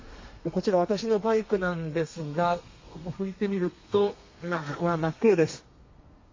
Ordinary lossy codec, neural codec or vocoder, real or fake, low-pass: MP3, 32 kbps; codec, 32 kHz, 1.9 kbps, SNAC; fake; 7.2 kHz